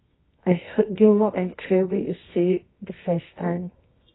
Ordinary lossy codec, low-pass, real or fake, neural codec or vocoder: AAC, 16 kbps; 7.2 kHz; fake; codec, 24 kHz, 0.9 kbps, WavTokenizer, medium music audio release